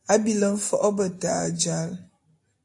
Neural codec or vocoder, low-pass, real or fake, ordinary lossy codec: none; 10.8 kHz; real; AAC, 48 kbps